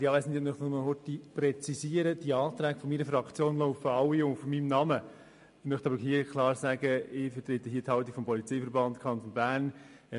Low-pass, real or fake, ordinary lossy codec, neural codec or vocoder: 10.8 kHz; real; MP3, 48 kbps; none